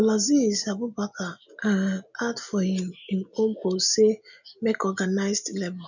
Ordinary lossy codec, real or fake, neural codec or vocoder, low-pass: none; real; none; 7.2 kHz